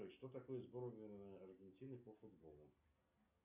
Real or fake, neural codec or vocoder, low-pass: real; none; 3.6 kHz